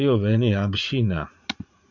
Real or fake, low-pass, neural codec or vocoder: fake; 7.2 kHz; vocoder, 44.1 kHz, 80 mel bands, Vocos